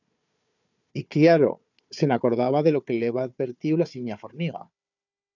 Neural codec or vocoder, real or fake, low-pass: codec, 16 kHz, 4 kbps, FunCodec, trained on Chinese and English, 50 frames a second; fake; 7.2 kHz